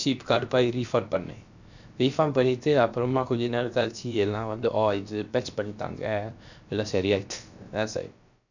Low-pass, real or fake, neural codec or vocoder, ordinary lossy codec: 7.2 kHz; fake; codec, 16 kHz, about 1 kbps, DyCAST, with the encoder's durations; none